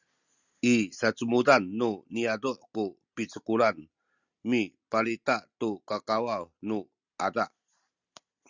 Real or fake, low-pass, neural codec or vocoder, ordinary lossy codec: real; 7.2 kHz; none; Opus, 64 kbps